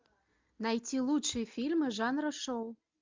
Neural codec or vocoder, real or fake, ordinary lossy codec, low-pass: none; real; MP3, 64 kbps; 7.2 kHz